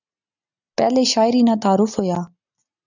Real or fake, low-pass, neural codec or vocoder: real; 7.2 kHz; none